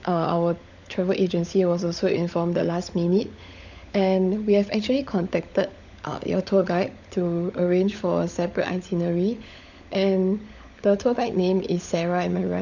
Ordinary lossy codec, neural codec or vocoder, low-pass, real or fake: none; codec, 16 kHz, 16 kbps, FunCodec, trained on LibriTTS, 50 frames a second; 7.2 kHz; fake